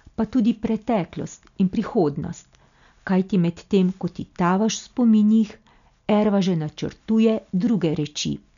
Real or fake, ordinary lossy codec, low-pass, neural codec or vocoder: real; none; 7.2 kHz; none